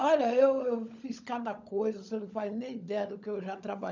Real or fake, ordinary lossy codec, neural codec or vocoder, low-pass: fake; none; codec, 16 kHz, 16 kbps, FunCodec, trained on LibriTTS, 50 frames a second; 7.2 kHz